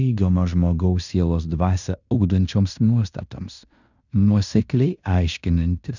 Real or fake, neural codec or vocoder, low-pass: fake; codec, 16 kHz in and 24 kHz out, 0.9 kbps, LongCat-Audio-Codec, fine tuned four codebook decoder; 7.2 kHz